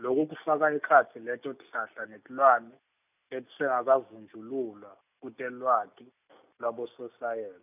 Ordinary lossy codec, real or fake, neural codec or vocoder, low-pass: none; real; none; 3.6 kHz